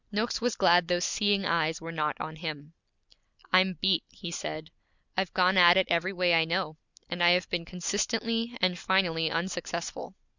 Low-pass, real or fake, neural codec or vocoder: 7.2 kHz; real; none